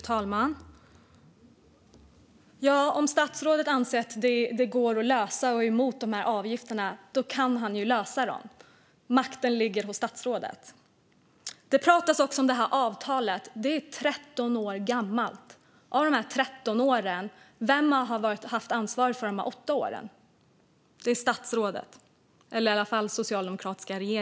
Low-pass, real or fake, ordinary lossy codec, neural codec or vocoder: none; real; none; none